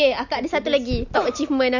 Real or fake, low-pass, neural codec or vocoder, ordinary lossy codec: real; 7.2 kHz; none; none